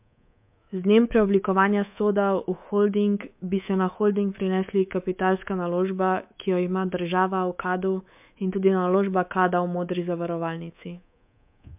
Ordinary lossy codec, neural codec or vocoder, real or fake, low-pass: MP3, 32 kbps; codec, 24 kHz, 3.1 kbps, DualCodec; fake; 3.6 kHz